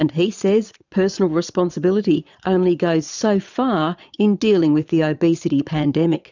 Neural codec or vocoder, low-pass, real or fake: vocoder, 22.05 kHz, 80 mel bands, Vocos; 7.2 kHz; fake